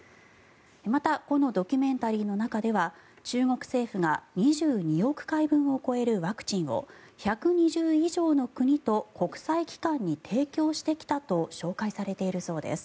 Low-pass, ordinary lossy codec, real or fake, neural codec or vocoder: none; none; real; none